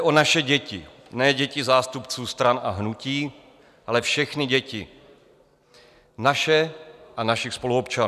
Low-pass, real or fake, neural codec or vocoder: 14.4 kHz; real; none